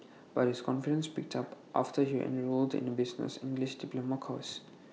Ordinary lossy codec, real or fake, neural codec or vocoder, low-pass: none; real; none; none